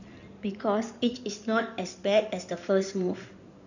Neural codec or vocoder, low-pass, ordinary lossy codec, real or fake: codec, 16 kHz in and 24 kHz out, 2.2 kbps, FireRedTTS-2 codec; 7.2 kHz; none; fake